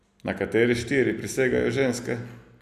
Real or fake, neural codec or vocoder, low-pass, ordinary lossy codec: real; none; 14.4 kHz; none